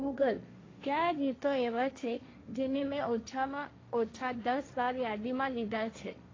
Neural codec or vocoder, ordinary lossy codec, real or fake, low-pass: codec, 16 kHz, 1.1 kbps, Voila-Tokenizer; AAC, 32 kbps; fake; 7.2 kHz